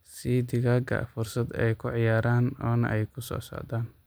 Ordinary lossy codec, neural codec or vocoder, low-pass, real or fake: none; none; none; real